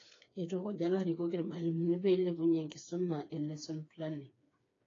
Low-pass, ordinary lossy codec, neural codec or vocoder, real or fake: 7.2 kHz; AAC, 32 kbps; codec, 16 kHz, 4 kbps, FreqCodec, smaller model; fake